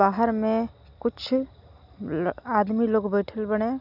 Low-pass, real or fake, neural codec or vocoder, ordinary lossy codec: 5.4 kHz; real; none; none